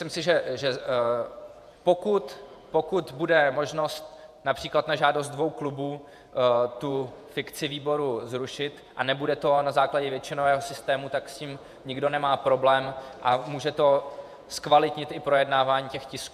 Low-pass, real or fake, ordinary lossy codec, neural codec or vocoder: 14.4 kHz; fake; AAC, 96 kbps; vocoder, 48 kHz, 128 mel bands, Vocos